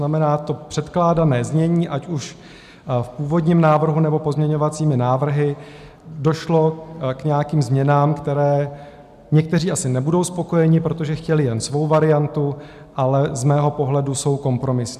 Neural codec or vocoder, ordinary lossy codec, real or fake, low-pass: none; MP3, 96 kbps; real; 14.4 kHz